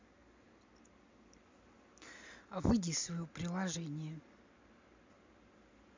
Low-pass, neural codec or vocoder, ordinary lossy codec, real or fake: 7.2 kHz; none; MP3, 64 kbps; real